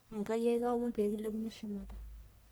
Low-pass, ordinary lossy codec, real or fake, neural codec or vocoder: none; none; fake; codec, 44.1 kHz, 1.7 kbps, Pupu-Codec